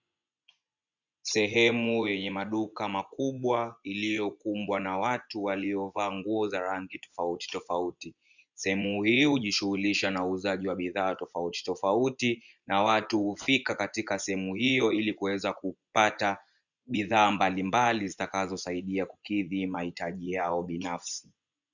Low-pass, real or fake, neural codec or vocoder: 7.2 kHz; fake; vocoder, 24 kHz, 100 mel bands, Vocos